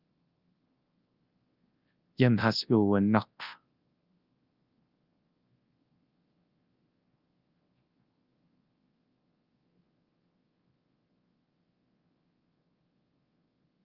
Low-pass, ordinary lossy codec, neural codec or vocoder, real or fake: 5.4 kHz; Opus, 32 kbps; codec, 24 kHz, 0.9 kbps, WavTokenizer, large speech release; fake